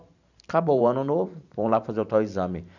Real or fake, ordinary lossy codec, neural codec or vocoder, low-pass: fake; none; vocoder, 44.1 kHz, 128 mel bands every 512 samples, BigVGAN v2; 7.2 kHz